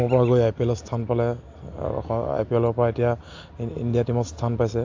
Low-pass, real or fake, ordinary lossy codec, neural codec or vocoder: 7.2 kHz; real; AAC, 48 kbps; none